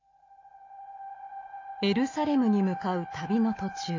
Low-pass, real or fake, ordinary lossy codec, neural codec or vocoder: 7.2 kHz; real; AAC, 32 kbps; none